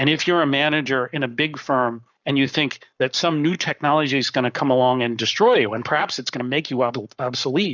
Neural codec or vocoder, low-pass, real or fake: codec, 44.1 kHz, 7.8 kbps, Pupu-Codec; 7.2 kHz; fake